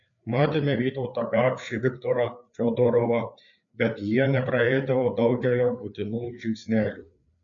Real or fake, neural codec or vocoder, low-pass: fake; codec, 16 kHz, 4 kbps, FreqCodec, larger model; 7.2 kHz